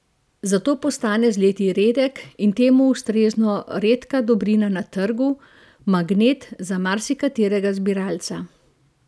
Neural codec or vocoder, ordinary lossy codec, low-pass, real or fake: none; none; none; real